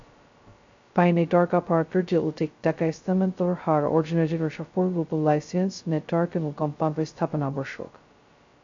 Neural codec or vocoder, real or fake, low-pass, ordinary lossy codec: codec, 16 kHz, 0.2 kbps, FocalCodec; fake; 7.2 kHz; AAC, 48 kbps